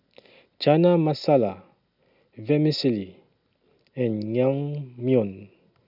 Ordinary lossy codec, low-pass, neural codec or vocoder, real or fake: none; 5.4 kHz; none; real